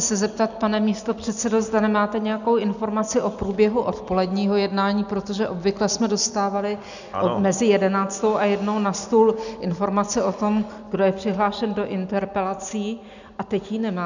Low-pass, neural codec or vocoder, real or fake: 7.2 kHz; none; real